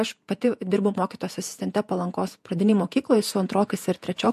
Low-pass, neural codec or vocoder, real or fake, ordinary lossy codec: 14.4 kHz; vocoder, 48 kHz, 128 mel bands, Vocos; fake; MP3, 64 kbps